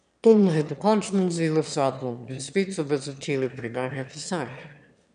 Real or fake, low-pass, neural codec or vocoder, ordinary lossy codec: fake; 9.9 kHz; autoencoder, 22.05 kHz, a latent of 192 numbers a frame, VITS, trained on one speaker; none